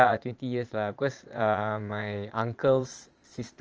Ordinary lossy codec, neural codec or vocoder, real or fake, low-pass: Opus, 24 kbps; vocoder, 22.05 kHz, 80 mel bands, Vocos; fake; 7.2 kHz